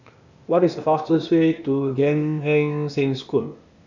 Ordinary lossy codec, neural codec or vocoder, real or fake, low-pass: none; codec, 16 kHz, 0.8 kbps, ZipCodec; fake; 7.2 kHz